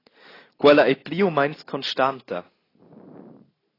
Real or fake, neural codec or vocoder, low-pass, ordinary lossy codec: real; none; 5.4 kHz; AAC, 24 kbps